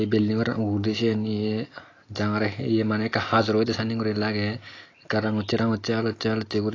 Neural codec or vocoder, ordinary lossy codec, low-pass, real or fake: none; AAC, 32 kbps; 7.2 kHz; real